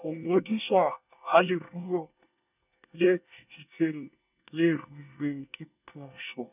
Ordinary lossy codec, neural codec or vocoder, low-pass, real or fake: none; codec, 24 kHz, 1 kbps, SNAC; 3.6 kHz; fake